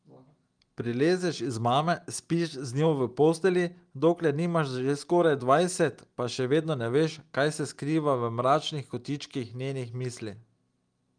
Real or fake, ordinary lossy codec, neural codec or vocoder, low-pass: real; Opus, 32 kbps; none; 9.9 kHz